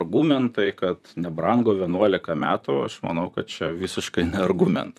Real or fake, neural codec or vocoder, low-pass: fake; vocoder, 44.1 kHz, 128 mel bands, Pupu-Vocoder; 14.4 kHz